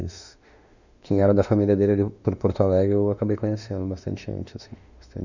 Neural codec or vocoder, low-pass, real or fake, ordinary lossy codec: autoencoder, 48 kHz, 32 numbers a frame, DAC-VAE, trained on Japanese speech; 7.2 kHz; fake; none